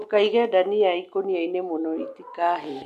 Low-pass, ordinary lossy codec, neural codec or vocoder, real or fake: 14.4 kHz; none; none; real